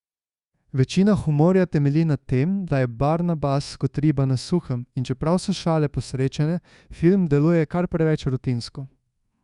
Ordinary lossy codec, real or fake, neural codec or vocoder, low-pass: Opus, 64 kbps; fake; codec, 24 kHz, 1.2 kbps, DualCodec; 10.8 kHz